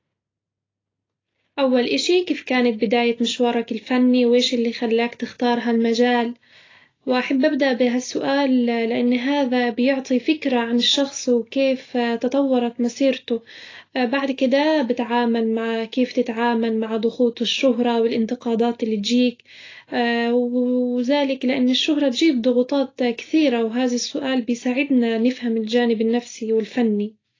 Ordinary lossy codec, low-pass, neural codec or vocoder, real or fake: AAC, 32 kbps; 7.2 kHz; none; real